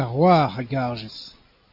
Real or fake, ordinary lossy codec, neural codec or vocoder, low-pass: real; AAC, 48 kbps; none; 5.4 kHz